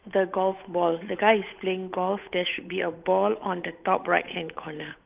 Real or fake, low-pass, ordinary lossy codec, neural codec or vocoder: fake; 3.6 kHz; Opus, 24 kbps; codec, 16 kHz, 8 kbps, FunCodec, trained on Chinese and English, 25 frames a second